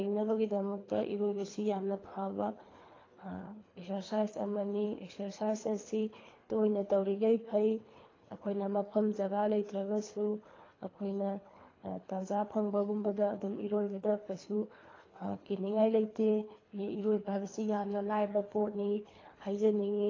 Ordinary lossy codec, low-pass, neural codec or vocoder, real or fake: AAC, 32 kbps; 7.2 kHz; codec, 24 kHz, 3 kbps, HILCodec; fake